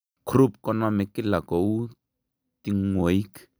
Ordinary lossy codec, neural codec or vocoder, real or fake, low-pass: none; none; real; none